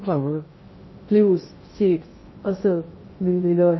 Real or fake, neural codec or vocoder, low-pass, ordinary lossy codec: fake; codec, 16 kHz in and 24 kHz out, 0.6 kbps, FocalCodec, streaming, 2048 codes; 7.2 kHz; MP3, 24 kbps